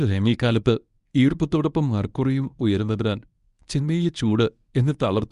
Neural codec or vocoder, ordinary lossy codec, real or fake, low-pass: codec, 24 kHz, 0.9 kbps, WavTokenizer, medium speech release version 1; Opus, 64 kbps; fake; 10.8 kHz